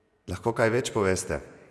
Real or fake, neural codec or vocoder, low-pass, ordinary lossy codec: fake; vocoder, 24 kHz, 100 mel bands, Vocos; none; none